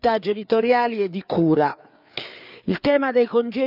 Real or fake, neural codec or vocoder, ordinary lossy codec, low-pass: fake; codec, 16 kHz, 4 kbps, FreqCodec, larger model; none; 5.4 kHz